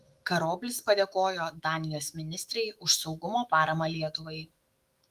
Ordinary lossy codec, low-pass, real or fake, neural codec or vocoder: Opus, 32 kbps; 14.4 kHz; fake; autoencoder, 48 kHz, 128 numbers a frame, DAC-VAE, trained on Japanese speech